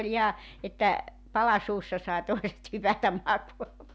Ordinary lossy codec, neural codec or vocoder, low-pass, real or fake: none; none; none; real